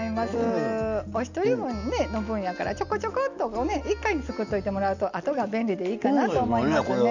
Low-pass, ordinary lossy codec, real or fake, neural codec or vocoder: 7.2 kHz; none; real; none